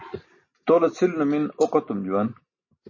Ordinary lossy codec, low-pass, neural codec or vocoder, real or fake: MP3, 32 kbps; 7.2 kHz; none; real